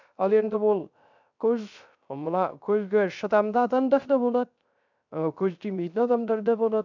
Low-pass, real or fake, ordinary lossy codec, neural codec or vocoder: 7.2 kHz; fake; none; codec, 16 kHz, 0.3 kbps, FocalCodec